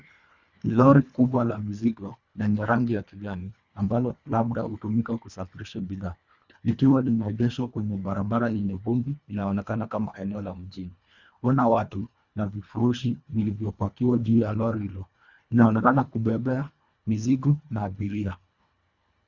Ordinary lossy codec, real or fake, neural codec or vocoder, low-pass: Opus, 64 kbps; fake; codec, 24 kHz, 1.5 kbps, HILCodec; 7.2 kHz